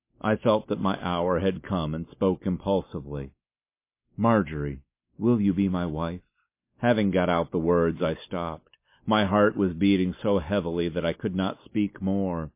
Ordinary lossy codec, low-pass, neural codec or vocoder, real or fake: MP3, 24 kbps; 3.6 kHz; none; real